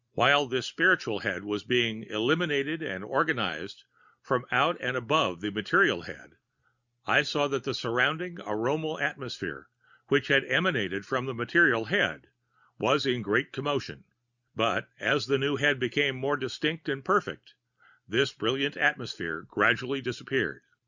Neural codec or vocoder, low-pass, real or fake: none; 7.2 kHz; real